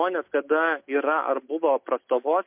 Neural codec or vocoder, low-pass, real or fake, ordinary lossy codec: none; 3.6 kHz; real; MP3, 32 kbps